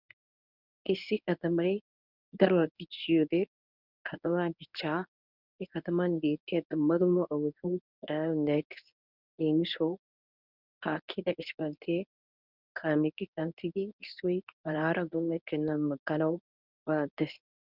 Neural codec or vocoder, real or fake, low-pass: codec, 24 kHz, 0.9 kbps, WavTokenizer, medium speech release version 1; fake; 5.4 kHz